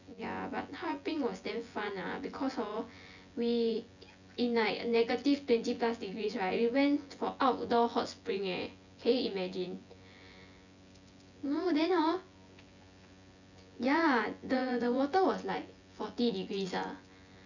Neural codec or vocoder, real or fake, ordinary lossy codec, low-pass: vocoder, 24 kHz, 100 mel bands, Vocos; fake; none; 7.2 kHz